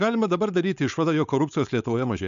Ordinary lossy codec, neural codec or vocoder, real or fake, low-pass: AAC, 96 kbps; codec, 16 kHz, 4.8 kbps, FACodec; fake; 7.2 kHz